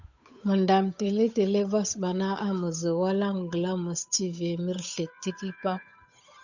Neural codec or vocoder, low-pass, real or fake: codec, 16 kHz, 8 kbps, FunCodec, trained on Chinese and English, 25 frames a second; 7.2 kHz; fake